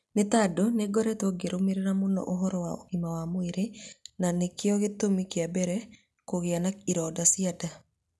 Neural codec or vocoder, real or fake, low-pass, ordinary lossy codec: none; real; none; none